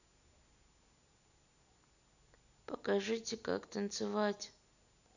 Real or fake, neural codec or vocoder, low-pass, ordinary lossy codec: real; none; 7.2 kHz; none